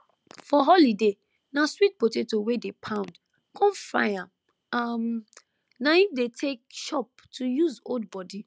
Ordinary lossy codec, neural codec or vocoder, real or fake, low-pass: none; none; real; none